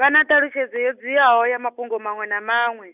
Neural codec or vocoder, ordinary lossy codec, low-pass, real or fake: none; none; 3.6 kHz; real